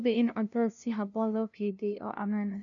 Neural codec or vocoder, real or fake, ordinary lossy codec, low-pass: codec, 16 kHz, 0.5 kbps, FunCodec, trained on LibriTTS, 25 frames a second; fake; none; 7.2 kHz